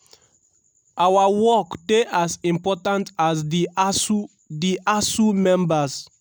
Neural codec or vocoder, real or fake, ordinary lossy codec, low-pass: none; real; none; none